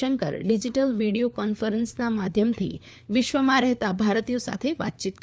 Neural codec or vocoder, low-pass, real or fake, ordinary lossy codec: codec, 16 kHz, 4 kbps, FunCodec, trained on LibriTTS, 50 frames a second; none; fake; none